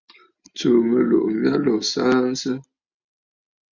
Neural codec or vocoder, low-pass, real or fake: none; 7.2 kHz; real